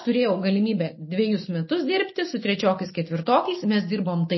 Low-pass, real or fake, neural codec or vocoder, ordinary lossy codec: 7.2 kHz; real; none; MP3, 24 kbps